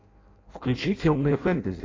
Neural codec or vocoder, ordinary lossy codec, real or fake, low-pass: codec, 16 kHz in and 24 kHz out, 0.6 kbps, FireRedTTS-2 codec; AAC, 32 kbps; fake; 7.2 kHz